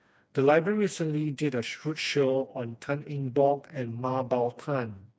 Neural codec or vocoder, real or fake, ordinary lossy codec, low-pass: codec, 16 kHz, 2 kbps, FreqCodec, smaller model; fake; none; none